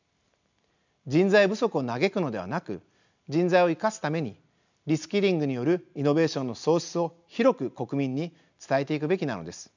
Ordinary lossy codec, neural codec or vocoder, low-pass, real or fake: none; none; 7.2 kHz; real